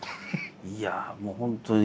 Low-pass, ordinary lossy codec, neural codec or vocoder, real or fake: none; none; none; real